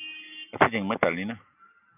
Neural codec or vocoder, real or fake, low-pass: none; real; 3.6 kHz